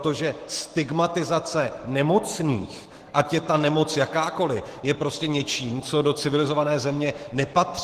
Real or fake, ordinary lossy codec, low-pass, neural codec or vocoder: fake; Opus, 16 kbps; 14.4 kHz; autoencoder, 48 kHz, 128 numbers a frame, DAC-VAE, trained on Japanese speech